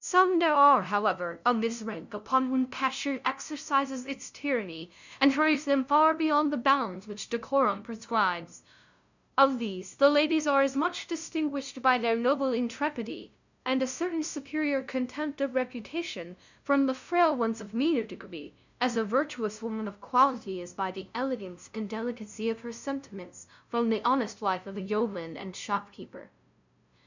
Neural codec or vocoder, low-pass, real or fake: codec, 16 kHz, 0.5 kbps, FunCodec, trained on LibriTTS, 25 frames a second; 7.2 kHz; fake